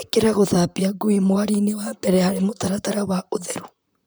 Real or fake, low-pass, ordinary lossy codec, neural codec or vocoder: fake; none; none; vocoder, 44.1 kHz, 128 mel bands, Pupu-Vocoder